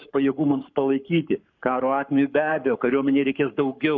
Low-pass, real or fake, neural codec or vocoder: 7.2 kHz; fake; codec, 44.1 kHz, 7.8 kbps, Pupu-Codec